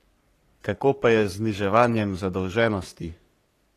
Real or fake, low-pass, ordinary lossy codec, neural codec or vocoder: fake; 14.4 kHz; AAC, 48 kbps; codec, 44.1 kHz, 3.4 kbps, Pupu-Codec